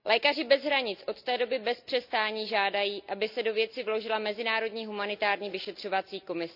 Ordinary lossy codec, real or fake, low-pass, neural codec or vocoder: none; real; 5.4 kHz; none